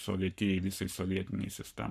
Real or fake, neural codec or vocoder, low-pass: fake; codec, 44.1 kHz, 7.8 kbps, Pupu-Codec; 14.4 kHz